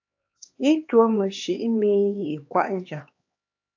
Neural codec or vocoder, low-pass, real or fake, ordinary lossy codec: codec, 16 kHz, 2 kbps, X-Codec, HuBERT features, trained on LibriSpeech; 7.2 kHz; fake; AAC, 48 kbps